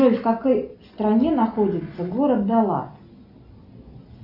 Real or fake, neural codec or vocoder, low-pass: real; none; 5.4 kHz